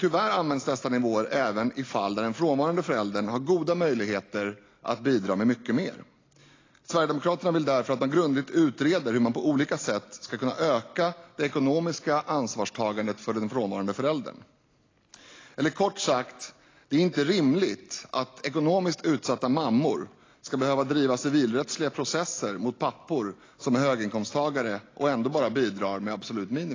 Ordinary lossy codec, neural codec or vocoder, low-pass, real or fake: AAC, 32 kbps; none; 7.2 kHz; real